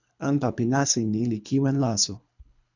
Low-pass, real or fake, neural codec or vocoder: 7.2 kHz; fake; codec, 24 kHz, 3 kbps, HILCodec